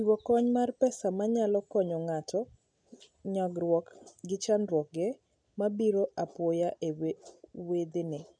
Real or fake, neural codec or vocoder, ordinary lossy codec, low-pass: real; none; none; 9.9 kHz